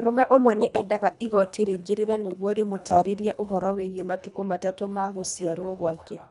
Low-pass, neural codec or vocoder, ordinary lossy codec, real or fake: 10.8 kHz; codec, 24 kHz, 1.5 kbps, HILCodec; none; fake